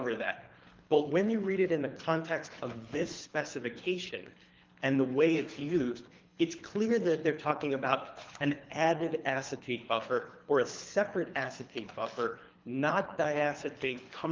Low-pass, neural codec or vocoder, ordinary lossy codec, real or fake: 7.2 kHz; codec, 24 kHz, 3 kbps, HILCodec; Opus, 24 kbps; fake